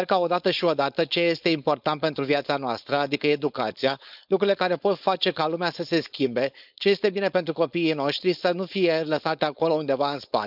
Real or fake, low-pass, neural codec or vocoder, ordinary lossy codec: fake; 5.4 kHz; codec, 16 kHz, 4.8 kbps, FACodec; none